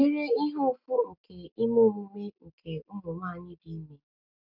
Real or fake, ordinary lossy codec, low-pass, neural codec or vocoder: real; none; 5.4 kHz; none